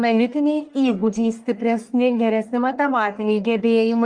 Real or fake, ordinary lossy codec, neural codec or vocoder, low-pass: fake; Opus, 32 kbps; codec, 44.1 kHz, 1.7 kbps, Pupu-Codec; 9.9 kHz